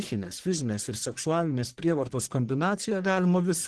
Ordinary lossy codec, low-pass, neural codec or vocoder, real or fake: Opus, 16 kbps; 10.8 kHz; codec, 44.1 kHz, 1.7 kbps, Pupu-Codec; fake